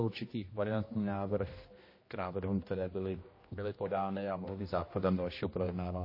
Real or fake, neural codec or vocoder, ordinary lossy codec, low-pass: fake; codec, 16 kHz, 1 kbps, X-Codec, HuBERT features, trained on general audio; MP3, 24 kbps; 5.4 kHz